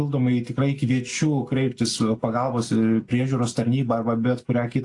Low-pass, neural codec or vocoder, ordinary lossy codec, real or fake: 10.8 kHz; none; AAC, 48 kbps; real